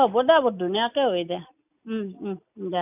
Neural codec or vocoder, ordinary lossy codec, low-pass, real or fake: none; none; 3.6 kHz; real